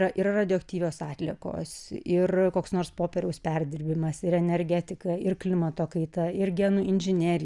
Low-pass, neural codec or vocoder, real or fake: 10.8 kHz; none; real